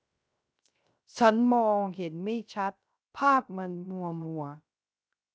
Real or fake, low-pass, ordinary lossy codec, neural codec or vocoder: fake; none; none; codec, 16 kHz, 0.3 kbps, FocalCodec